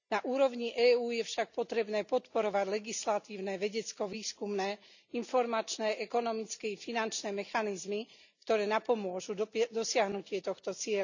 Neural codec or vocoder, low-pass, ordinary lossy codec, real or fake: none; 7.2 kHz; none; real